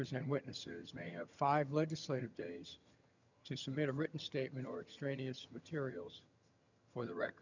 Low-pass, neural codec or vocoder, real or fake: 7.2 kHz; vocoder, 22.05 kHz, 80 mel bands, HiFi-GAN; fake